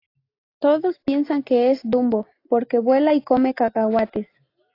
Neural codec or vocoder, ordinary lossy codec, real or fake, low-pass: none; AAC, 32 kbps; real; 5.4 kHz